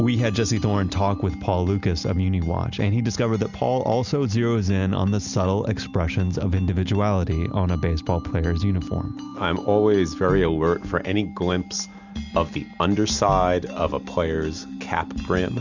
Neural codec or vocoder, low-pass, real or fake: none; 7.2 kHz; real